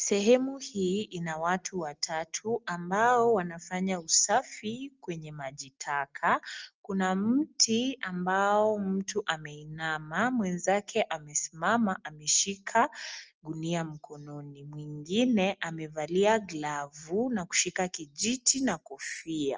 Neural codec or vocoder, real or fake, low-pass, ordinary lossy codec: none; real; 7.2 kHz; Opus, 24 kbps